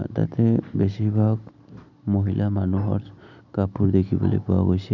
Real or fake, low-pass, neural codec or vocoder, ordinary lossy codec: real; 7.2 kHz; none; none